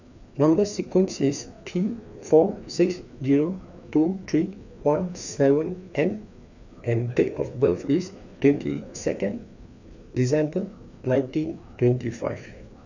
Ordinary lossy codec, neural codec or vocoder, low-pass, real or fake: none; codec, 16 kHz, 2 kbps, FreqCodec, larger model; 7.2 kHz; fake